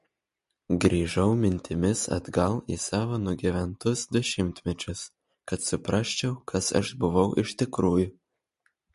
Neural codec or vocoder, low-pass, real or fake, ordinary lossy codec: none; 14.4 kHz; real; MP3, 48 kbps